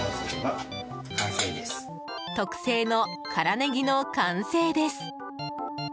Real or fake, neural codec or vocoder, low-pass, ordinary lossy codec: real; none; none; none